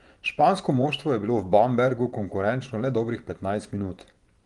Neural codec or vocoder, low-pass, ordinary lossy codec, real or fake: vocoder, 24 kHz, 100 mel bands, Vocos; 10.8 kHz; Opus, 24 kbps; fake